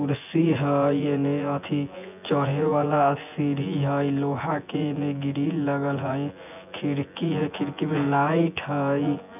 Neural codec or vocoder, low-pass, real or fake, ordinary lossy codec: vocoder, 24 kHz, 100 mel bands, Vocos; 3.6 kHz; fake; none